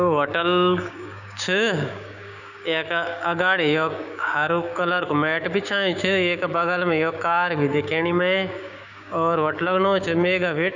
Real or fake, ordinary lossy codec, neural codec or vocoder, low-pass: fake; none; codec, 16 kHz, 6 kbps, DAC; 7.2 kHz